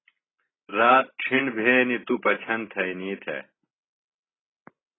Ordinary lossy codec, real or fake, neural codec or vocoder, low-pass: AAC, 16 kbps; real; none; 7.2 kHz